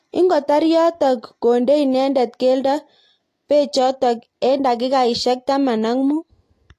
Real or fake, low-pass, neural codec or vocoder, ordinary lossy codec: real; 14.4 kHz; none; AAC, 48 kbps